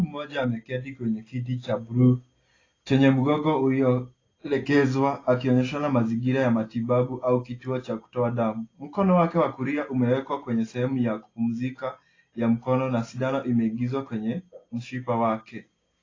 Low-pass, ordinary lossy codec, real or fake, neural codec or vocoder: 7.2 kHz; AAC, 32 kbps; real; none